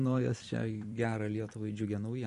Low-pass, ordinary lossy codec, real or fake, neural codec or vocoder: 14.4 kHz; MP3, 48 kbps; fake; vocoder, 44.1 kHz, 128 mel bands every 256 samples, BigVGAN v2